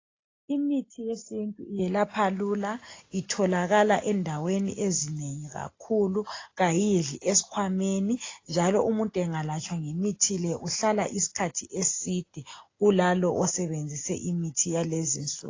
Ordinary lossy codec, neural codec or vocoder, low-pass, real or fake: AAC, 32 kbps; none; 7.2 kHz; real